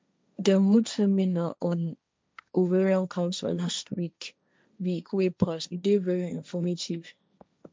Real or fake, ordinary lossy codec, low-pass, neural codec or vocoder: fake; none; none; codec, 16 kHz, 1.1 kbps, Voila-Tokenizer